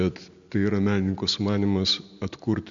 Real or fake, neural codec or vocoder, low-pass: real; none; 7.2 kHz